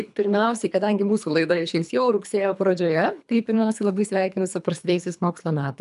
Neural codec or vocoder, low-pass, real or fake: codec, 24 kHz, 3 kbps, HILCodec; 10.8 kHz; fake